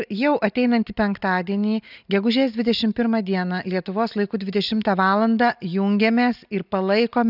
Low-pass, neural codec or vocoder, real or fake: 5.4 kHz; none; real